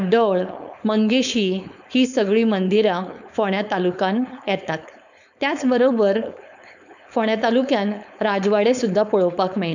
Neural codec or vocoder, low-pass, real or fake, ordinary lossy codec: codec, 16 kHz, 4.8 kbps, FACodec; 7.2 kHz; fake; none